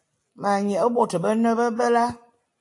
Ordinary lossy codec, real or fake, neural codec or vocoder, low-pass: AAC, 48 kbps; real; none; 10.8 kHz